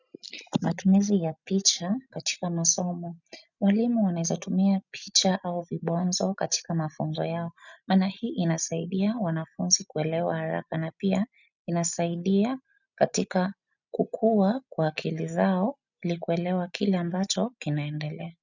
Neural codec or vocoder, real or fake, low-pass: none; real; 7.2 kHz